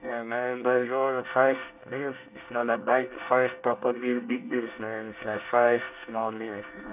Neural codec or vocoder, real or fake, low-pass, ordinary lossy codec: codec, 24 kHz, 1 kbps, SNAC; fake; 3.6 kHz; none